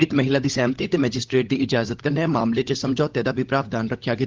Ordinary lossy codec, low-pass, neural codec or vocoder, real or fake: Opus, 16 kbps; 7.2 kHz; codec, 16 kHz, 16 kbps, FunCodec, trained on LibriTTS, 50 frames a second; fake